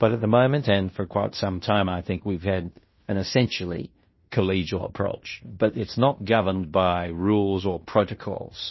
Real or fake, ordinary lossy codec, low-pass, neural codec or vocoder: fake; MP3, 24 kbps; 7.2 kHz; codec, 16 kHz in and 24 kHz out, 0.9 kbps, LongCat-Audio-Codec, fine tuned four codebook decoder